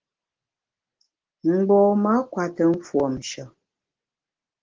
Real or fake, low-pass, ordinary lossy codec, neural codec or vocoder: real; 7.2 kHz; Opus, 16 kbps; none